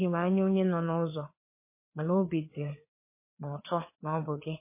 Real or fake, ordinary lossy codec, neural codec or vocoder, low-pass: fake; MP3, 24 kbps; codec, 16 kHz, 4 kbps, FreqCodec, larger model; 3.6 kHz